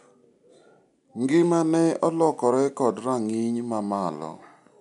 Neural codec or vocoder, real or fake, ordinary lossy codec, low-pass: none; real; none; 10.8 kHz